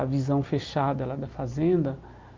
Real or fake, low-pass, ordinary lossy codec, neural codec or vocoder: real; 7.2 kHz; Opus, 32 kbps; none